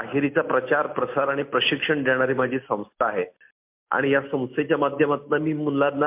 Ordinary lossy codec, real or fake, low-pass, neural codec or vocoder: MP3, 32 kbps; real; 3.6 kHz; none